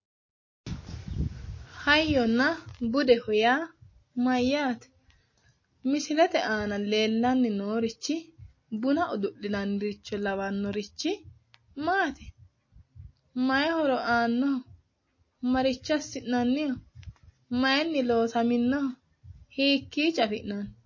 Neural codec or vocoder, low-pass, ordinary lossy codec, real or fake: none; 7.2 kHz; MP3, 32 kbps; real